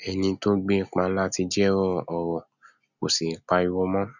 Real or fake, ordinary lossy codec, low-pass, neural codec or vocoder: real; none; 7.2 kHz; none